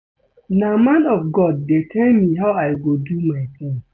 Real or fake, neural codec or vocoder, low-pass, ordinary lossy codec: real; none; none; none